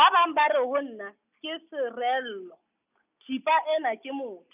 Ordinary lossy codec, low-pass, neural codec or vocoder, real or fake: none; 3.6 kHz; none; real